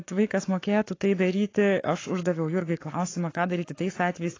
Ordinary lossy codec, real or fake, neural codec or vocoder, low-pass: AAC, 32 kbps; fake; codec, 44.1 kHz, 7.8 kbps, Pupu-Codec; 7.2 kHz